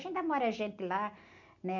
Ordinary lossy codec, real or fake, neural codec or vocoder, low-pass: none; real; none; 7.2 kHz